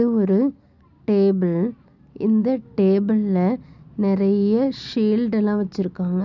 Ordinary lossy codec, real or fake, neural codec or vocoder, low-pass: none; real; none; 7.2 kHz